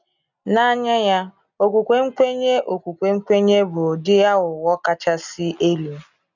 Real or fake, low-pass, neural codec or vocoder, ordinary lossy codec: real; 7.2 kHz; none; none